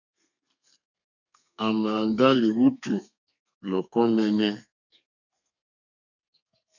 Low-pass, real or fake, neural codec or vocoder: 7.2 kHz; fake; codec, 32 kHz, 1.9 kbps, SNAC